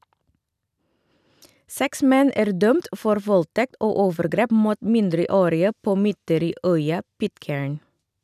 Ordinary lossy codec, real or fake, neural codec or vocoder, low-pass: none; real; none; 14.4 kHz